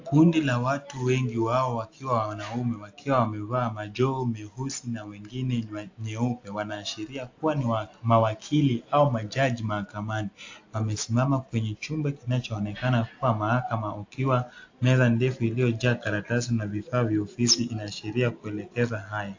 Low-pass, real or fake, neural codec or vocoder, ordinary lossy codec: 7.2 kHz; real; none; AAC, 48 kbps